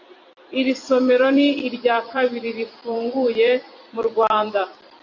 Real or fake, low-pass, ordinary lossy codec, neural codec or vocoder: fake; 7.2 kHz; AAC, 48 kbps; vocoder, 44.1 kHz, 128 mel bands every 256 samples, BigVGAN v2